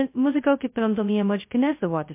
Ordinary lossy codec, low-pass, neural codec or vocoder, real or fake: MP3, 24 kbps; 3.6 kHz; codec, 16 kHz, 0.2 kbps, FocalCodec; fake